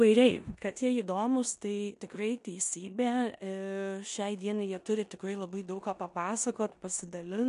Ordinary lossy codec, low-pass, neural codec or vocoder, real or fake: MP3, 64 kbps; 10.8 kHz; codec, 16 kHz in and 24 kHz out, 0.9 kbps, LongCat-Audio-Codec, four codebook decoder; fake